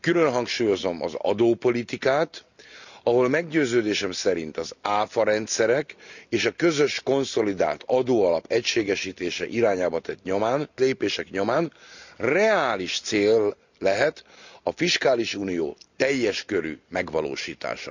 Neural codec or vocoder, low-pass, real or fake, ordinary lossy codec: none; 7.2 kHz; real; none